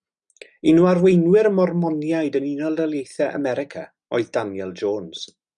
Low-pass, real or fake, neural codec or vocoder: 9.9 kHz; real; none